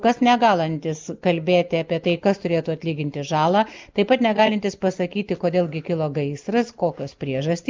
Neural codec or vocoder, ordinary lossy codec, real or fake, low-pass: none; Opus, 24 kbps; real; 7.2 kHz